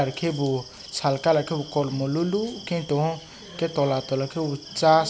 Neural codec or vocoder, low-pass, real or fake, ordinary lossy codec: none; none; real; none